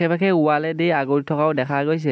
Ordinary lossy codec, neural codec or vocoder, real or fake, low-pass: none; none; real; none